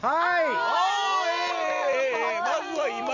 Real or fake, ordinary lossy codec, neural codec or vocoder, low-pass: real; none; none; 7.2 kHz